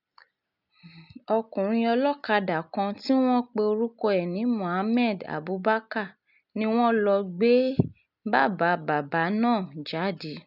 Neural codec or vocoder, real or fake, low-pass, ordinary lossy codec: none; real; 5.4 kHz; none